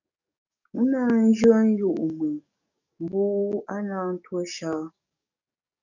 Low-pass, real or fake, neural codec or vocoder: 7.2 kHz; fake; codec, 44.1 kHz, 7.8 kbps, DAC